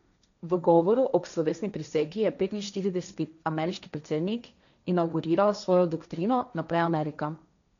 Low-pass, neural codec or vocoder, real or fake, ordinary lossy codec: 7.2 kHz; codec, 16 kHz, 1.1 kbps, Voila-Tokenizer; fake; none